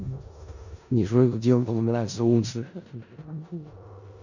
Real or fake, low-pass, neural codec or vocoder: fake; 7.2 kHz; codec, 16 kHz in and 24 kHz out, 0.4 kbps, LongCat-Audio-Codec, four codebook decoder